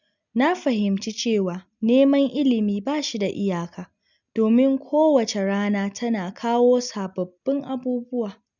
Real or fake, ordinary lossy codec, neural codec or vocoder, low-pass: real; none; none; 7.2 kHz